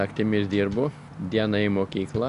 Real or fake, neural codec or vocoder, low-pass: real; none; 10.8 kHz